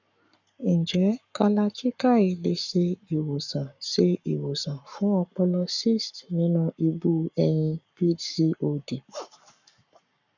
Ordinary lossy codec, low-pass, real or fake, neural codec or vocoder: none; 7.2 kHz; fake; codec, 44.1 kHz, 7.8 kbps, Pupu-Codec